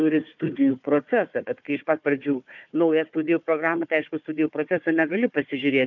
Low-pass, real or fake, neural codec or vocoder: 7.2 kHz; fake; codec, 16 kHz, 4 kbps, FunCodec, trained on Chinese and English, 50 frames a second